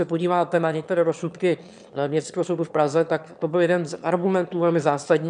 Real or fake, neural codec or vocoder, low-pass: fake; autoencoder, 22.05 kHz, a latent of 192 numbers a frame, VITS, trained on one speaker; 9.9 kHz